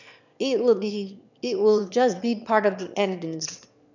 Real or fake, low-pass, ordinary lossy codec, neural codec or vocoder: fake; 7.2 kHz; none; autoencoder, 22.05 kHz, a latent of 192 numbers a frame, VITS, trained on one speaker